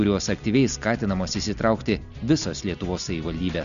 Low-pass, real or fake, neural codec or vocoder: 7.2 kHz; real; none